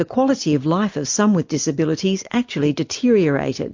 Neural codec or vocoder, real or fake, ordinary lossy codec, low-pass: none; real; MP3, 48 kbps; 7.2 kHz